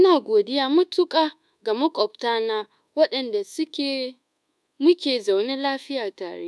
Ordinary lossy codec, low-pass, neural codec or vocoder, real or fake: none; none; codec, 24 kHz, 1.2 kbps, DualCodec; fake